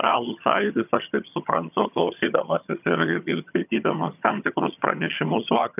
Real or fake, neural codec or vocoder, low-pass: fake; vocoder, 22.05 kHz, 80 mel bands, HiFi-GAN; 3.6 kHz